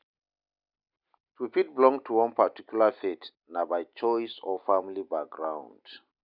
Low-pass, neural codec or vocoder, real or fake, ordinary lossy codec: 5.4 kHz; none; real; none